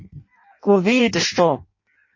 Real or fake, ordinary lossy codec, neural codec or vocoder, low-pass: fake; MP3, 32 kbps; codec, 16 kHz in and 24 kHz out, 0.6 kbps, FireRedTTS-2 codec; 7.2 kHz